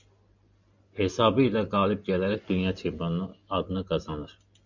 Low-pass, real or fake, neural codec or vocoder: 7.2 kHz; real; none